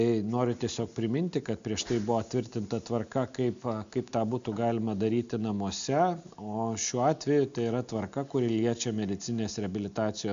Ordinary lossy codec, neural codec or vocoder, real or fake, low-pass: MP3, 64 kbps; none; real; 7.2 kHz